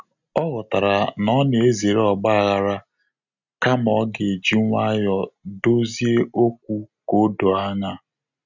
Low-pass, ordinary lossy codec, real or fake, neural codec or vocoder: 7.2 kHz; none; real; none